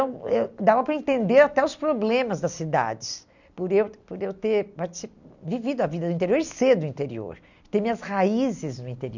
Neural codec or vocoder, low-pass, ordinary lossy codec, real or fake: none; 7.2 kHz; none; real